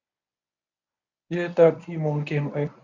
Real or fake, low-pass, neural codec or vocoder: fake; 7.2 kHz; codec, 24 kHz, 0.9 kbps, WavTokenizer, medium speech release version 1